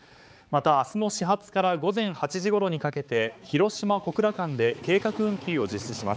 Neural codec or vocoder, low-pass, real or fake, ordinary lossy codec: codec, 16 kHz, 4 kbps, X-Codec, HuBERT features, trained on balanced general audio; none; fake; none